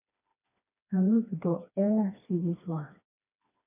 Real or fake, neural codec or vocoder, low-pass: fake; codec, 16 kHz, 2 kbps, FreqCodec, smaller model; 3.6 kHz